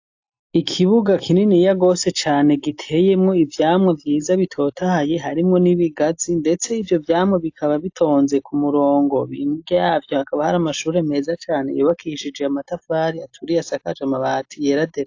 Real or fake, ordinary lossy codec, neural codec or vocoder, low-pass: real; AAC, 48 kbps; none; 7.2 kHz